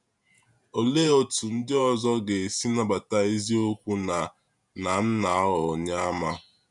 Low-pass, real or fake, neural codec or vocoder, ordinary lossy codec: 10.8 kHz; fake; vocoder, 48 kHz, 128 mel bands, Vocos; none